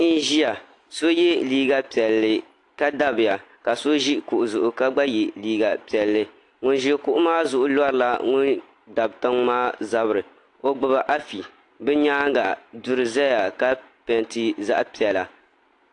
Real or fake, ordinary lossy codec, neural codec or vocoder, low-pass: real; AAC, 48 kbps; none; 10.8 kHz